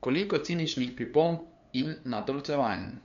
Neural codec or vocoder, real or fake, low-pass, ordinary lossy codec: codec, 16 kHz, 2 kbps, FunCodec, trained on LibriTTS, 25 frames a second; fake; 7.2 kHz; none